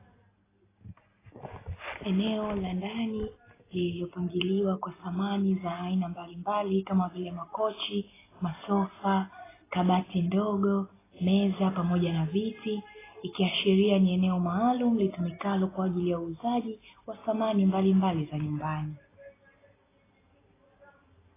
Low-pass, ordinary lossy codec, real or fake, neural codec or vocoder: 3.6 kHz; AAC, 16 kbps; real; none